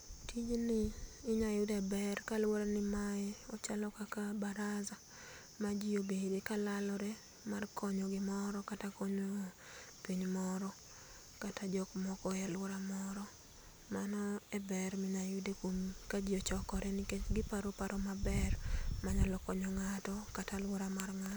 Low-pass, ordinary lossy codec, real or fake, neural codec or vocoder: none; none; real; none